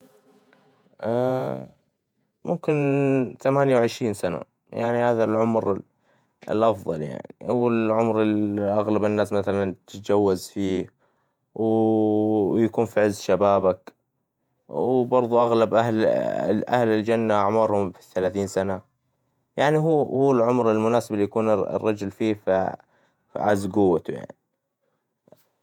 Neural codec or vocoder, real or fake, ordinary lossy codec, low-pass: vocoder, 48 kHz, 128 mel bands, Vocos; fake; MP3, 96 kbps; 19.8 kHz